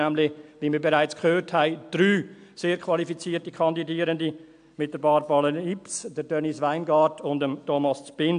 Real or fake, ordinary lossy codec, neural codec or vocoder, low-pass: real; AAC, 64 kbps; none; 9.9 kHz